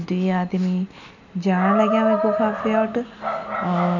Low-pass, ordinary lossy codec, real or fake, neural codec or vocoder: 7.2 kHz; none; real; none